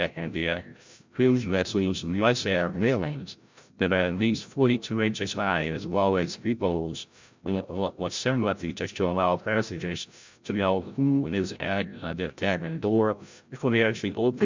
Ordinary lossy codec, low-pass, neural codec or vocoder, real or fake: MP3, 64 kbps; 7.2 kHz; codec, 16 kHz, 0.5 kbps, FreqCodec, larger model; fake